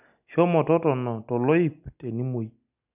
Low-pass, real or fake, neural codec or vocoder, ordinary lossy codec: 3.6 kHz; real; none; none